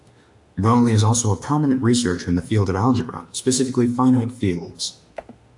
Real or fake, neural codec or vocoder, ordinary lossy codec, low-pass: fake; autoencoder, 48 kHz, 32 numbers a frame, DAC-VAE, trained on Japanese speech; AAC, 64 kbps; 10.8 kHz